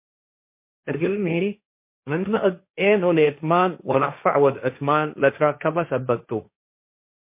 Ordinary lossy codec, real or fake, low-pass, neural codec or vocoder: MP3, 24 kbps; fake; 3.6 kHz; codec, 16 kHz, 1.1 kbps, Voila-Tokenizer